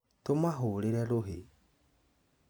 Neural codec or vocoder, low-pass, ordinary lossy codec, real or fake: none; none; none; real